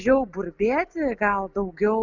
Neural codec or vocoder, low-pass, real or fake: none; 7.2 kHz; real